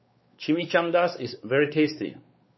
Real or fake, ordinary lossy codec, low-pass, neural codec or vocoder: fake; MP3, 24 kbps; 7.2 kHz; codec, 16 kHz, 4 kbps, X-Codec, WavLM features, trained on Multilingual LibriSpeech